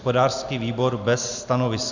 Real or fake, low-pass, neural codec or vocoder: real; 7.2 kHz; none